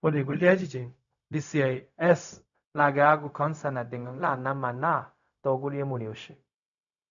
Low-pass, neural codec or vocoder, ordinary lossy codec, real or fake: 7.2 kHz; codec, 16 kHz, 0.4 kbps, LongCat-Audio-Codec; none; fake